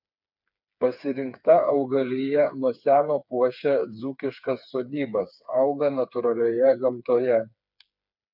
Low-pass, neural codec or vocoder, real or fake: 5.4 kHz; codec, 16 kHz, 4 kbps, FreqCodec, smaller model; fake